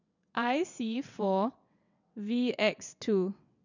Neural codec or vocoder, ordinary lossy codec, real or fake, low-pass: vocoder, 22.05 kHz, 80 mel bands, WaveNeXt; none; fake; 7.2 kHz